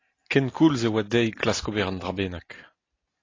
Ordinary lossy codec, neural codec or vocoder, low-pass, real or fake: AAC, 32 kbps; none; 7.2 kHz; real